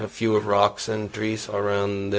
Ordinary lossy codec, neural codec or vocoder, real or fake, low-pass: none; codec, 16 kHz, 0.4 kbps, LongCat-Audio-Codec; fake; none